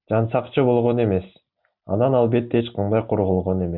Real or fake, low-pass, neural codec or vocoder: real; 5.4 kHz; none